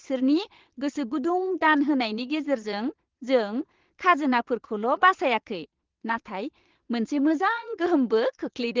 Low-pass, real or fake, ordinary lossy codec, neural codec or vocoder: 7.2 kHz; fake; Opus, 16 kbps; vocoder, 22.05 kHz, 80 mel bands, Vocos